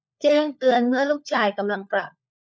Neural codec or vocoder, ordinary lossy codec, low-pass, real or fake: codec, 16 kHz, 4 kbps, FunCodec, trained on LibriTTS, 50 frames a second; none; none; fake